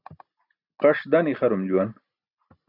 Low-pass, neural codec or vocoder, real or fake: 5.4 kHz; none; real